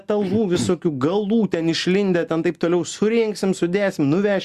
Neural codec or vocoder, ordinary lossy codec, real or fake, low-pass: none; Opus, 64 kbps; real; 14.4 kHz